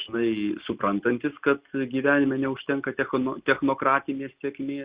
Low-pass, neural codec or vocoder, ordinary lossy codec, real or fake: 3.6 kHz; none; Opus, 64 kbps; real